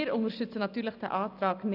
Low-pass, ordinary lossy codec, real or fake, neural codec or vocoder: 5.4 kHz; none; real; none